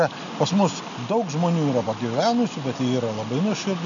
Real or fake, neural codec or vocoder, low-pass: real; none; 7.2 kHz